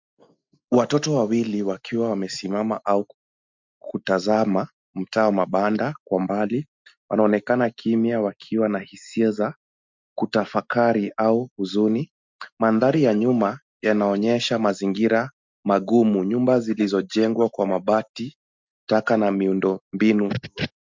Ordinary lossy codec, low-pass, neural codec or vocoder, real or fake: MP3, 64 kbps; 7.2 kHz; none; real